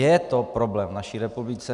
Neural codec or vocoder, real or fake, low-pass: none; real; 10.8 kHz